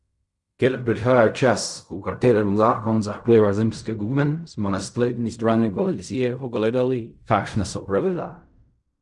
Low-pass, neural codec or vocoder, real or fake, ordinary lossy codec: 10.8 kHz; codec, 16 kHz in and 24 kHz out, 0.4 kbps, LongCat-Audio-Codec, fine tuned four codebook decoder; fake; none